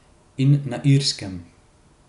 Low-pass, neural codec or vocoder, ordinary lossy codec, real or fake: 10.8 kHz; none; none; real